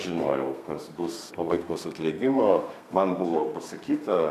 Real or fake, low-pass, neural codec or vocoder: fake; 14.4 kHz; codec, 32 kHz, 1.9 kbps, SNAC